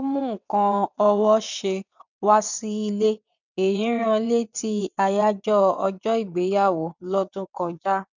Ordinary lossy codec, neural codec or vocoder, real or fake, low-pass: none; vocoder, 22.05 kHz, 80 mel bands, WaveNeXt; fake; 7.2 kHz